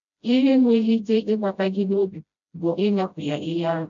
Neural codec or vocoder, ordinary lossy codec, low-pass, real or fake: codec, 16 kHz, 0.5 kbps, FreqCodec, smaller model; AAC, 64 kbps; 7.2 kHz; fake